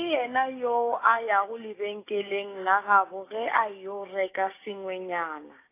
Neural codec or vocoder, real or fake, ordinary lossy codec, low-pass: codec, 16 kHz, 6 kbps, DAC; fake; AAC, 24 kbps; 3.6 kHz